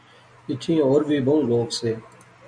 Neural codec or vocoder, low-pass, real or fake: none; 9.9 kHz; real